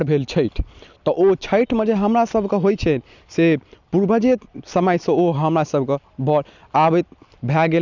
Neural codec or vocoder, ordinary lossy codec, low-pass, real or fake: none; none; 7.2 kHz; real